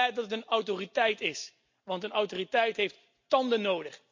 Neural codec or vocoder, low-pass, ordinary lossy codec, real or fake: none; 7.2 kHz; MP3, 48 kbps; real